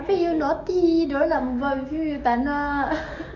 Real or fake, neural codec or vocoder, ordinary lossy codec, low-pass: fake; codec, 44.1 kHz, 7.8 kbps, DAC; none; 7.2 kHz